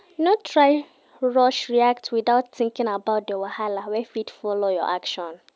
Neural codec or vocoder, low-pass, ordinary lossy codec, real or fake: none; none; none; real